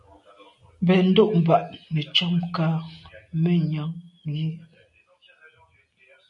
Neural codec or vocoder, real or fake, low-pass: none; real; 10.8 kHz